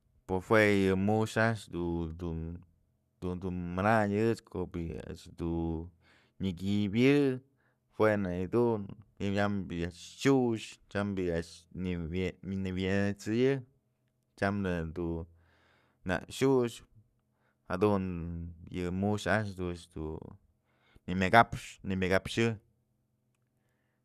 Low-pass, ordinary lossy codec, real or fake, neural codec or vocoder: 14.4 kHz; none; fake; codec, 44.1 kHz, 7.8 kbps, Pupu-Codec